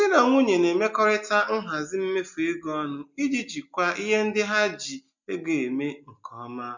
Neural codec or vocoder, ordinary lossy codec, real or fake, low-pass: none; none; real; 7.2 kHz